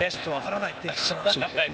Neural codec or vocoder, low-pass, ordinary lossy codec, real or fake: codec, 16 kHz, 0.8 kbps, ZipCodec; none; none; fake